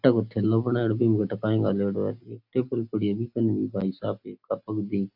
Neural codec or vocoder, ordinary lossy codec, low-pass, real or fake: none; AAC, 48 kbps; 5.4 kHz; real